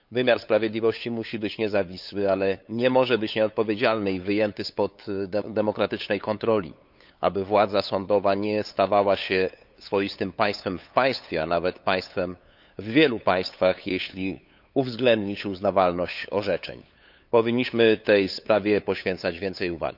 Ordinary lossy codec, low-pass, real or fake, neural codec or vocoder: none; 5.4 kHz; fake; codec, 16 kHz, 8 kbps, FunCodec, trained on LibriTTS, 25 frames a second